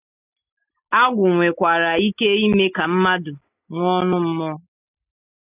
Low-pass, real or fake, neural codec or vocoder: 3.6 kHz; real; none